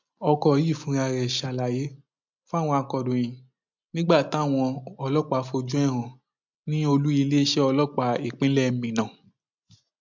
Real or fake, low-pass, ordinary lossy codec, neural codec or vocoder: real; 7.2 kHz; MP3, 64 kbps; none